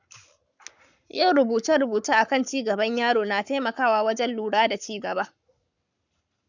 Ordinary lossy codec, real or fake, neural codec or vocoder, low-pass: none; fake; codec, 44.1 kHz, 7.8 kbps, Pupu-Codec; 7.2 kHz